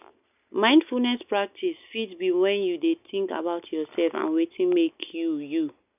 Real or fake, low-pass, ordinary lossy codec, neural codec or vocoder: real; 3.6 kHz; none; none